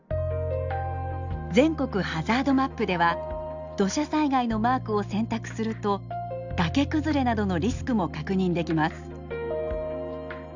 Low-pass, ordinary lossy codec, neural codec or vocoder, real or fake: 7.2 kHz; none; none; real